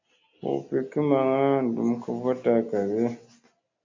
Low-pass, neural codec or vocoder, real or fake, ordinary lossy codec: 7.2 kHz; none; real; AAC, 48 kbps